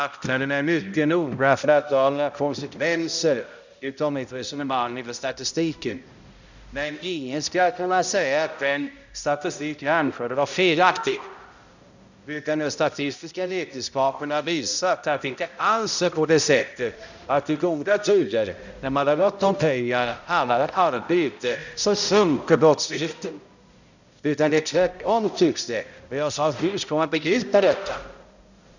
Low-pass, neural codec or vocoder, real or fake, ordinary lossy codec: 7.2 kHz; codec, 16 kHz, 0.5 kbps, X-Codec, HuBERT features, trained on balanced general audio; fake; none